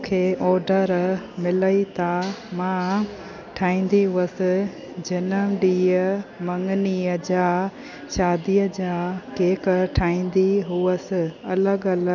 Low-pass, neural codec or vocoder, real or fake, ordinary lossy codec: 7.2 kHz; none; real; none